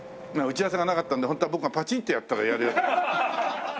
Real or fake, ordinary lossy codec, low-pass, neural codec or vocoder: real; none; none; none